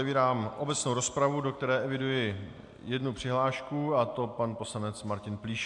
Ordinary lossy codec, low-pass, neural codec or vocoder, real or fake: AAC, 64 kbps; 10.8 kHz; none; real